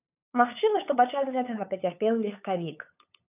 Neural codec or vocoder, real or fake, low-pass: codec, 16 kHz, 8 kbps, FunCodec, trained on LibriTTS, 25 frames a second; fake; 3.6 kHz